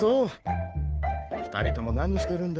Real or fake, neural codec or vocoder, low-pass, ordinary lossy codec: fake; codec, 16 kHz, 2 kbps, FunCodec, trained on Chinese and English, 25 frames a second; none; none